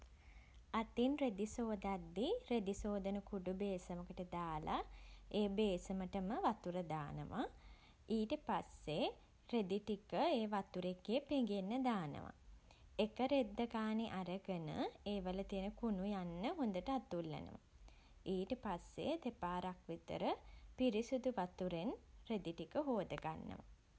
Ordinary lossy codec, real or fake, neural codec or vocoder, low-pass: none; real; none; none